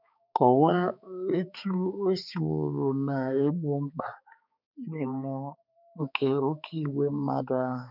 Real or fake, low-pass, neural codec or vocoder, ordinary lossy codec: fake; 5.4 kHz; codec, 16 kHz, 4 kbps, X-Codec, HuBERT features, trained on balanced general audio; none